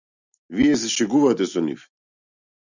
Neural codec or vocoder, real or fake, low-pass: none; real; 7.2 kHz